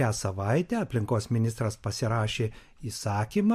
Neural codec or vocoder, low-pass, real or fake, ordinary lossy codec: vocoder, 44.1 kHz, 128 mel bands every 256 samples, BigVGAN v2; 14.4 kHz; fake; MP3, 64 kbps